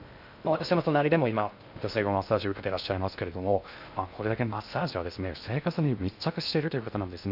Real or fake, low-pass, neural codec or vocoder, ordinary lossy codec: fake; 5.4 kHz; codec, 16 kHz in and 24 kHz out, 0.6 kbps, FocalCodec, streaming, 2048 codes; none